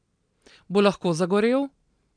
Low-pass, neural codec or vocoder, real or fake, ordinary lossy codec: 9.9 kHz; none; real; none